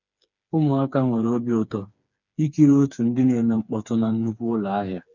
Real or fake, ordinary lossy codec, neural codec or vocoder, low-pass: fake; none; codec, 16 kHz, 4 kbps, FreqCodec, smaller model; 7.2 kHz